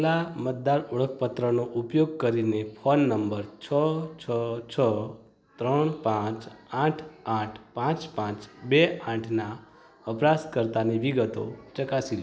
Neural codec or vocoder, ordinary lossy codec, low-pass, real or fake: none; none; none; real